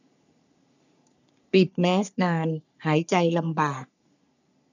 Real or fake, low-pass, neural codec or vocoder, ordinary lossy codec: fake; 7.2 kHz; codec, 44.1 kHz, 3.4 kbps, Pupu-Codec; none